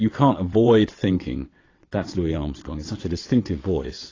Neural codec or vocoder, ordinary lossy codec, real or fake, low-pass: vocoder, 22.05 kHz, 80 mel bands, WaveNeXt; AAC, 32 kbps; fake; 7.2 kHz